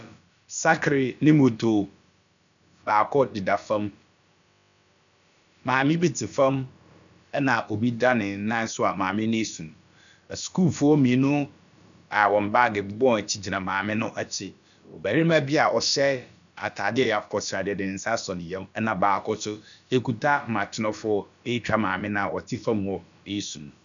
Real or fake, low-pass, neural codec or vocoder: fake; 7.2 kHz; codec, 16 kHz, about 1 kbps, DyCAST, with the encoder's durations